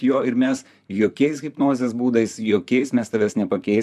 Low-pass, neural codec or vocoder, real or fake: 14.4 kHz; vocoder, 44.1 kHz, 128 mel bands, Pupu-Vocoder; fake